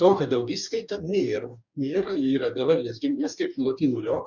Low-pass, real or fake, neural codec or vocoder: 7.2 kHz; fake; codec, 44.1 kHz, 2.6 kbps, DAC